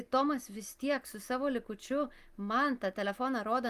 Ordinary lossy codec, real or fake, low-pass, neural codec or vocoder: Opus, 24 kbps; real; 14.4 kHz; none